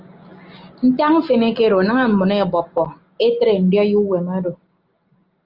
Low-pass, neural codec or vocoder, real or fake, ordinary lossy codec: 5.4 kHz; none; real; Opus, 24 kbps